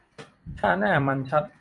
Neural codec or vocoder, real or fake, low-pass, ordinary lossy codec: vocoder, 44.1 kHz, 128 mel bands every 256 samples, BigVGAN v2; fake; 10.8 kHz; MP3, 64 kbps